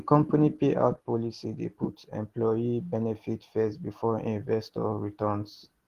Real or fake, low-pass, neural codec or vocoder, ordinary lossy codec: real; 14.4 kHz; none; Opus, 16 kbps